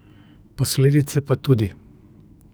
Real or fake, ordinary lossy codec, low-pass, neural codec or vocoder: fake; none; none; codec, 44.1 kHz, 2.6 kbps, SNAC